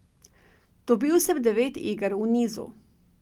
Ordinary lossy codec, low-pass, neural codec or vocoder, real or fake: Opus, 32 kbps; 19.8 kHz; none; real